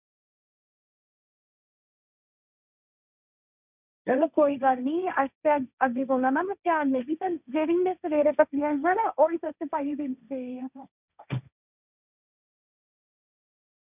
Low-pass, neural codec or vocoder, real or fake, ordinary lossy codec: 3.6 kHz; codec, 16 kHz, 1.1 kbps, Voila-Tokenizer; fake; none